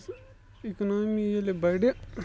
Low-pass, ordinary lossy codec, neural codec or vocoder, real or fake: none; none; none; real